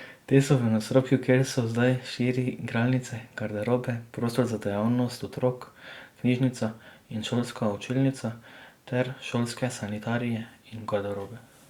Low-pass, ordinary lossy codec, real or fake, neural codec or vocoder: 19.8 kHz; Opus, 64 kbps; real; none